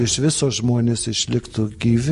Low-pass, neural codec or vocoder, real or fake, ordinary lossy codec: 14.4 kHz; none; real; MP3, 48 kbps